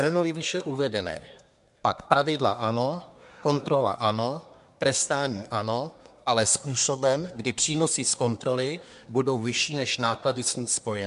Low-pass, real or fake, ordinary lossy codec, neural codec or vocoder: 10.8 kHz; fake; MP3, 64 kbps; codec, 24 kHz, 1 kbps, SNAC